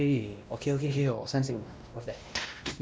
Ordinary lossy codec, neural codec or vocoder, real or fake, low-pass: none; codec, 16 kHz, 1 kbps, X-Codec, WavLM features, trained on Multilingual LibriSpeech; fake; none